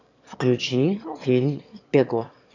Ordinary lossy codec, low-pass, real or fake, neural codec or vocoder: none; 7.2 kHz; fake; autoencoder, 22.05 kHz, a latent of 192 numbers a frame, VITS, trained on one speaker